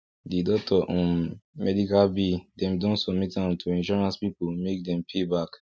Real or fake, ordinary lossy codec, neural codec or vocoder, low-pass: real; none; none; none